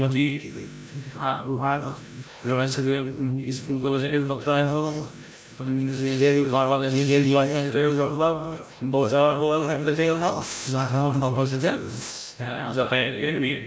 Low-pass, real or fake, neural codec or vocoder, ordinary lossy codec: none; fake; codec, 16 kHz, 0.5 kbps, FreqCodec, larger model; none